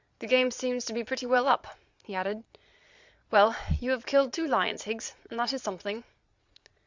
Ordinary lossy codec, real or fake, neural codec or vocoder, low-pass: Opus, 64 kbps; fake; vocoder, 22.05 kHz, 80 mel bands, Vocos; 7.2 kHz